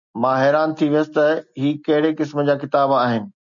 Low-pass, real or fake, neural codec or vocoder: 7.2 kHz; real; none